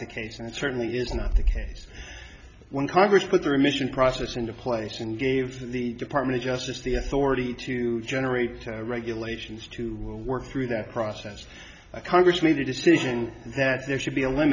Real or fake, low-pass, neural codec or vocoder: real; 7.2 kHz; none